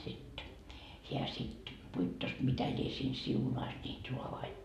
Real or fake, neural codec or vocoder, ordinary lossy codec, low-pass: real; none; none; 14.4 kHz